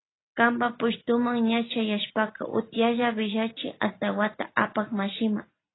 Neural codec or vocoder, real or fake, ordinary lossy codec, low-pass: none; real; AAC, 16 kbps; 7.2 kHz